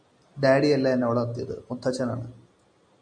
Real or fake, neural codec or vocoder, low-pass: real; none; 9.9 kHz